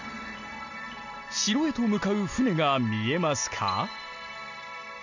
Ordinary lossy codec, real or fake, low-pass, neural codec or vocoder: none; real; 7.2 kHz; none